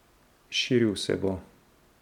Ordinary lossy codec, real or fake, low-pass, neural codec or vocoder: none; real; 19.8 kHz; none